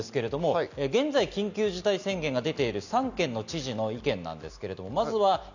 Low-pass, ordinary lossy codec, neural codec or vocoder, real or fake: 7.2 kHz; none; none; real